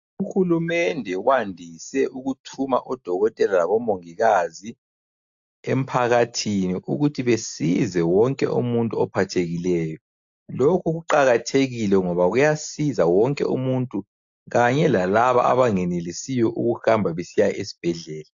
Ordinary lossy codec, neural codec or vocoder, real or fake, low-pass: AAC, 64 kbps; none; real; 7.2 kHz